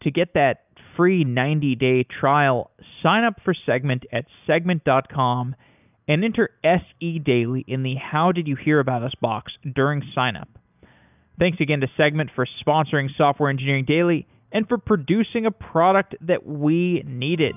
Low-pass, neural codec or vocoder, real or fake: 3.6 kHz; none; real